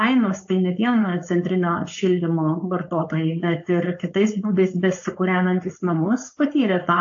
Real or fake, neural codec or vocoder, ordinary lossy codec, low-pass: fake; codec, 16 kHz, 4.8 kbps, FACodec; AAC, 48 kbps; 7.2 kHz